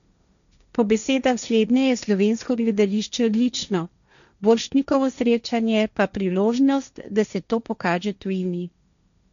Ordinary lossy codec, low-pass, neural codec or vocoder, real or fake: none; 7.2 kHz; codec, 16 kHz, 1.1 kbps, Voila-Tokenizer; fake